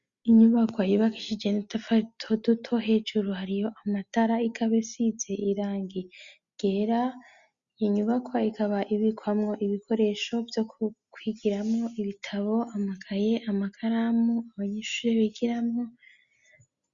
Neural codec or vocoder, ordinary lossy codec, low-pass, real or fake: none; Opus, 64 kbps; 7.2 kHz; real